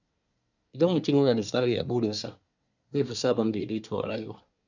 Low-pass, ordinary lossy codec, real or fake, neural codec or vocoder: 7.2 kHz; none; fake; codec, 24 kHz, 1 kbps, SNAC